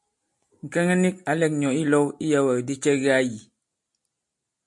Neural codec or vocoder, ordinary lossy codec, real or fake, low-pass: none; MP3, 48 kbps; real; 10.8 kHz